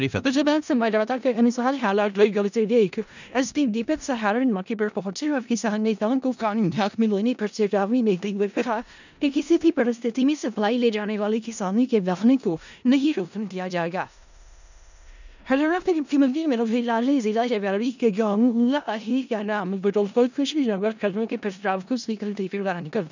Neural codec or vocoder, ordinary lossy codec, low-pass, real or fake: codec, 16 kHz in and 24 kHz out, 0.4 kbps, LongCat-Audio-Codec, four codebook decoder; none; 7.2 kHz; fake